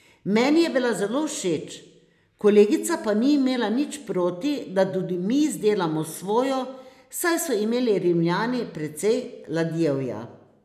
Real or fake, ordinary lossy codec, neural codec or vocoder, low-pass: real; none; none; 14.4 kHz